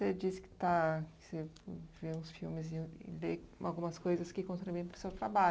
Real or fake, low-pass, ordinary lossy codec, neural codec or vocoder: real; none; none; none